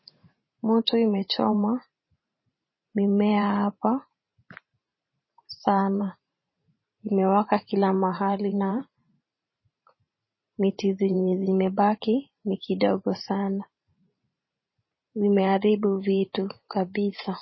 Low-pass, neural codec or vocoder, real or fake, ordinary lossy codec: 7.2 kHz; vocoder, 44.1 kHz, 128 mel bands every 512 samples, BigVGAN v2; fake; MP3, 24 kbps